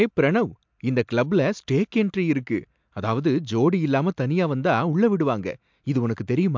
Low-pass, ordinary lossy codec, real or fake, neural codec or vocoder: 7.2 kHz; MP3, 64 kbps; real; none